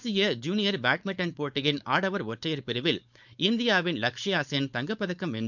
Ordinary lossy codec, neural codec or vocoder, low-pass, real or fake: none; codec, 16 kHz, 4.8 kbps, FACodec; 7.2 kHz; fake